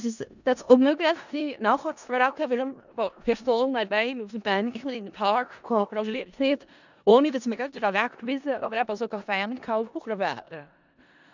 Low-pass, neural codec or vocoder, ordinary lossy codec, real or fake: 7.2 kHz; codec, 16 kHz in and 24 kHz out, 0.4 kbps, LongCat-Audio-Codec, four codebook decoder; none; fake